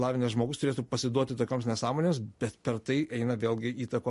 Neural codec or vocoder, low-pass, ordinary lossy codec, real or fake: none; 14.4 kHz; MP3, 48 kbps; real